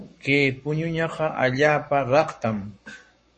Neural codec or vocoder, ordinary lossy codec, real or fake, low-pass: codec, 44.1 kHz, 7.8 kbps, Pupu-Codec; MP3, 32 kbps; fake; 10.8 kHz